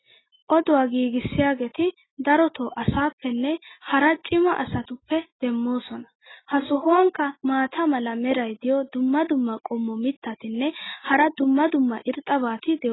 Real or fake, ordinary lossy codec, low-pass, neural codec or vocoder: real; AAC, 16 kbps; 7.2 kHz; none